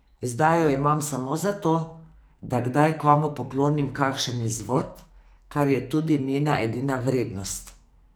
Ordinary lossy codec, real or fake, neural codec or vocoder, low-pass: none; fake; codec, 44.1 kHz, 2.6 kbps, SNAC; none